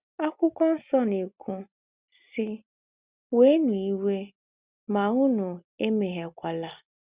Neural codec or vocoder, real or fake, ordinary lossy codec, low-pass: none; real; Opus, 64 kbps; 3.6 kHz